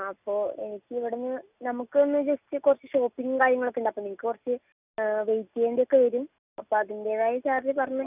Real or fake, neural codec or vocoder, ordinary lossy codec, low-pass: real; none; none; 3.6 kHz